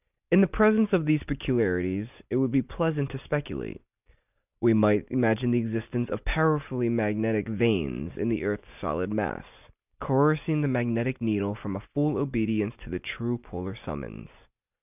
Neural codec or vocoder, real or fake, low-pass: none; real; 3.6 kHz